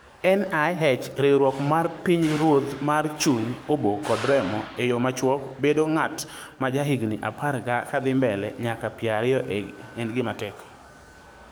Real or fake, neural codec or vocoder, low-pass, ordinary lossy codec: fake; codec, 44.1 kHz, 7.8 kbps, DAC; none; none